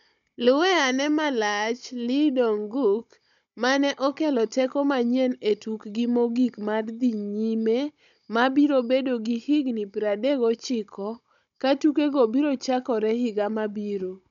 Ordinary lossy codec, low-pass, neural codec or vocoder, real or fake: none; 7.2 kHz; codec, 16 kHz, 16 kbps, FunCodec, trained on Chinese and English, 50 frames a second; fake